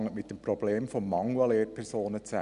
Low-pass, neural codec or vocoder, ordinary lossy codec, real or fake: 10.8 kHz; none; MP3, 96 kbps; real